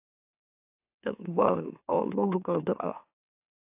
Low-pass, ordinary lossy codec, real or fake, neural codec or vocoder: 3.6 kHz; AAC, 32 kbps; fake; autoencoder, 44.1 kHz, a latent of 192 numbers a frame, MeloTTS